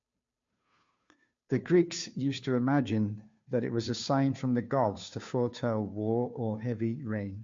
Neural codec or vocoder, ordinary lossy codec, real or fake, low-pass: codec, 16 kHz, 2 kbps, FunCodec, trained on Chinese and English, 25 frames a second; MP3, 48 kbps; fake; 7.2 kHz